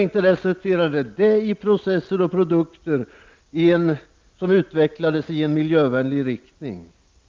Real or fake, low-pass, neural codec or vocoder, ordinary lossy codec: real; 7.2 kHz; none; Opus, 32 kbps